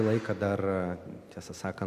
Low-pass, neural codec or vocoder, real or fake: 14.4 kHz; none; real